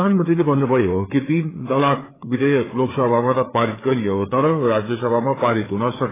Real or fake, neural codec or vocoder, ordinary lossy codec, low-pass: fake; codec, 16 kHz, 4 kbps, FreqCodec, larger model; AAC, 16 kbps; 3.6 kHz